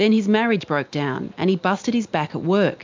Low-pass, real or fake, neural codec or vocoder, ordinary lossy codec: 7.2 kHz; real; none; MP3, 64 kbps